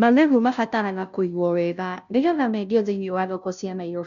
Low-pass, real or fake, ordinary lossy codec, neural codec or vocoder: 7.2 kHz; fake; none; codec, 16 kHz, 0.5 kbps, FunCodec, trained on Chinese and English, 25 frames a second